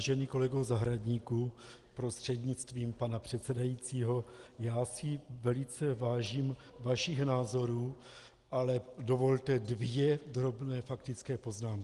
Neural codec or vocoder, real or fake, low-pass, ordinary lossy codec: none; real; 10.8 kHz; Opus, 24 kbps